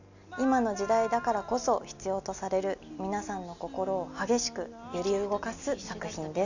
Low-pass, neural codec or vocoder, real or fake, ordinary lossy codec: 7.2 kHz; none; real; none